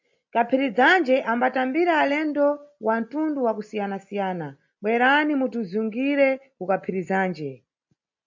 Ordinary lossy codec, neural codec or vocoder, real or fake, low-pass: MP3, 48 kbps; none; real; 7.2 kHz